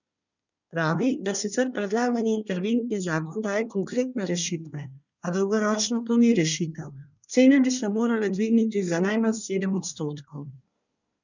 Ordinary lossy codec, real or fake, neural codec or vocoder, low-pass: none; fake; codec, 24 kHz, 1 kbps, SNAC; 7.2 kHz